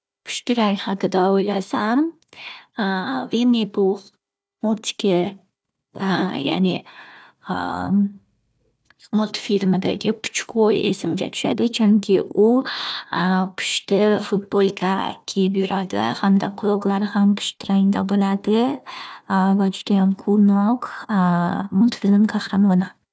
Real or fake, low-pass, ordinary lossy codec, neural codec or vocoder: fake; none; none; codec, 16 kHz, 1 kbps, FunCodec, trained on Chinese and English, 50 frames a second